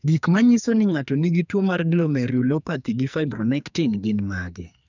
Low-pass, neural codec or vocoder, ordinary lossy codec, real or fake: 7.2 kHz; codec, 44.1 kHz, 2.6 kbps, SNAC; none; fake